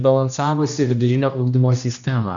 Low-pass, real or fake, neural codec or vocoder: 7.2 kHz; fake; codec, 16 kHz, 1 kbps, X-Codec, HuBERT features, trained on general audio